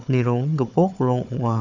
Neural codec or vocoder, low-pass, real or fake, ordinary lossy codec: codec, 16 kHz, 4 kbps, FunCodec, trained on Chinese and English, 50 frames a second; 7.2 kHz; fake; none